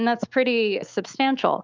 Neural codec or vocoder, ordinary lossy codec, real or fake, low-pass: autoencoder, 48 kHz, 128 numbers a frame, DAC-VAE, trained on Japanese speech; Opus, 24 kbps; fake; 7.2 kHz